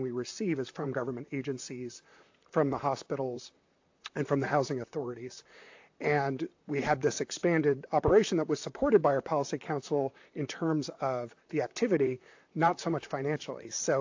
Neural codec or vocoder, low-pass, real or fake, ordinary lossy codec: vocoder, 44.1 kHz, 128 mel bands, Pupu-Vocoder; 7.2 kHz; fake; AAC, 48 kbps